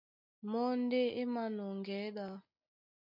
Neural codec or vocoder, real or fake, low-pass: none; real; 5.4 kHz